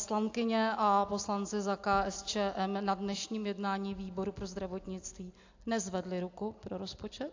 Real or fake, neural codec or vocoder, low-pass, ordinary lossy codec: real; none; 7.2 kHz; AAC, 48 kbps